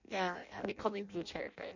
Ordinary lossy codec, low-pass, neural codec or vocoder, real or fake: none; 7.2 kHz; codec, 16 kHz in and 24 kHz out, 0.6 kbps, FireRedTTS-2 codec; fake